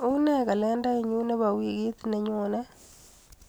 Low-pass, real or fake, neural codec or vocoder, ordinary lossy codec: none; real; none; none